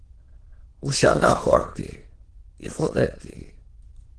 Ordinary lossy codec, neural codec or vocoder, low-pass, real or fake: Opus, 16 kbps; autoencoder, 22.05 kHz, a latent of 192 numbers a frame, VITS, trained on many speakers; 9.9 kHz; fake